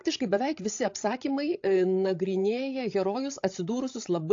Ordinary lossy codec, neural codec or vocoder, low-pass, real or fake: AAC, 48 kbps; codec, 16 kHz, 16 kbps, FreqCodec, larger model; 7.2 kHz; fake